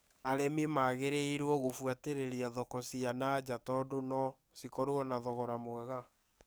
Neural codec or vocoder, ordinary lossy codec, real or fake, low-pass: codec, 44.1 kHz, 7.8 kbps, DAC; none; fake; none